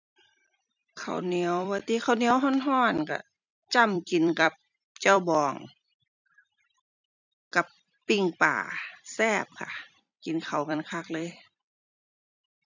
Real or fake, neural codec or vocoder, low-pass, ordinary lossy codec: fake; vocoder, 44.1 kHz, 128 mel bands every 256 samples, BigVGAN v2; 7.2 kHz; none